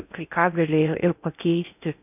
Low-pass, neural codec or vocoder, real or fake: 3.6 kHz; codec, 16 kHz in and 24 kHz out, 0.6 kbps, FocalCodec, streaming, 4096 codes; fake